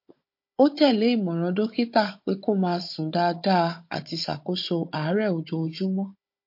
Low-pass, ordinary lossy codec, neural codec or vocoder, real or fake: 5.4 kHz; MP3, 32 kbps; codec, 16 kHz, 16 kbps, FunCodec, trained on Chinese and English, 50 frames a second; fake